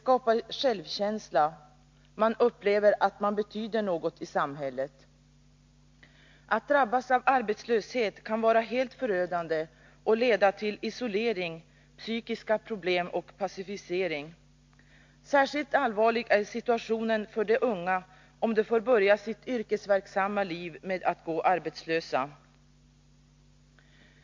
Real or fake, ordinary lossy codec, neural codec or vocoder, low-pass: real; MP3, 48 kbps; none; 7.2 kHz